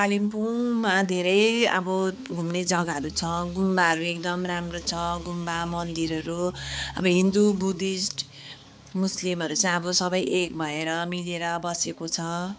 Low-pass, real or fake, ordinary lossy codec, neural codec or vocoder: none; fake; none; codec, 16 kHz, 4 kbps, X-Codec, HuBERT features, trained on balanced general audio